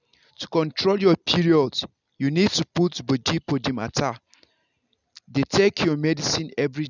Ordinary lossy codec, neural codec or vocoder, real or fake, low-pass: none; none; real; 7.2 kHz